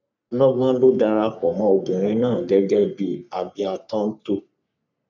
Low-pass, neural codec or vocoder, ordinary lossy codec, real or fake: 7.2 kHz; codec, 44.1 kHz, 3.4 kbps, Pupu-Codec; none; fake